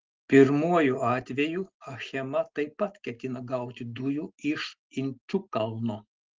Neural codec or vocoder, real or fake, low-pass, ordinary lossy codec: none; real; 7.2 kHz; Opus, 16 kbps